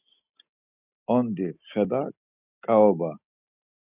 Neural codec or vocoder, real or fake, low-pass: none; real; 3.6 kHz